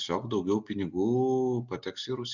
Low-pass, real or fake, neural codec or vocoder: 7.2 kHz; real; none